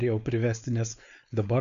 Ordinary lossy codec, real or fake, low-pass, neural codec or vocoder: MP3, 64 kbps; fake; 7.2 kHz; codec, 16 kHz, 4.8 kbps, FACodec